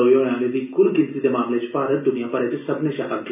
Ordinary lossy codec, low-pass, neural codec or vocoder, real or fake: none; 3.6 kHz; none; real